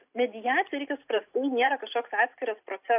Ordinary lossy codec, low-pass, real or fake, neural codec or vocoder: AAC, 32 kbps; 3.6 kHz; real; none